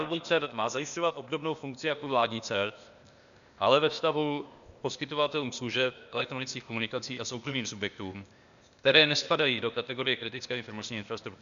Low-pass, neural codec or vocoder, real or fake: 7.2 kHz; codec, 16 kHz, 0.8 kbps, ZipCodec; fake